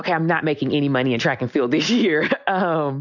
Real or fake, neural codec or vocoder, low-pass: real; none; 7.2 kHz